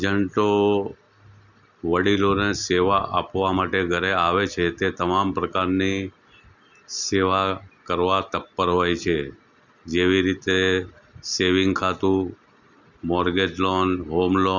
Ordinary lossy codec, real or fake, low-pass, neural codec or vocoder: none; real; 7.2 kHz; none